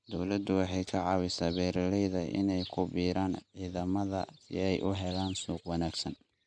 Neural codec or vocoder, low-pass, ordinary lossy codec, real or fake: none; 9.9 kHz; none; real